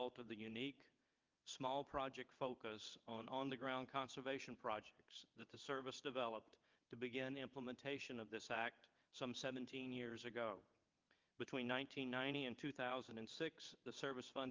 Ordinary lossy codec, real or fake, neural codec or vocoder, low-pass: Opus, 24 kbps; fake; vocoder, 22.05 kHz, 80 mel bands, WaveNeXt; 7.2 kHz